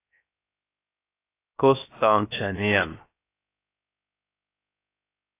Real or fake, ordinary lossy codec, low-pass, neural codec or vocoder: fake; AAC, 24 kbps; 3.6 kHz; codec, 16 kHz, 0.3 kbps, FocalCodec